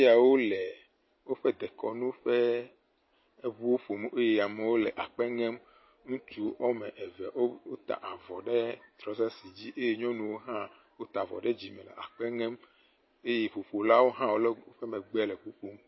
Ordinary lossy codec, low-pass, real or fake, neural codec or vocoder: MP3, 24 kbps; 7.2 kHz; real; none